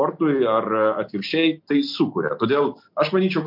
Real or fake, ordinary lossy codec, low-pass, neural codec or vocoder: real; MP3, 48 kbps; 5.4 kHz; none